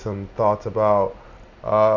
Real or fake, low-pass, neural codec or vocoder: real; 7.2 kHz; none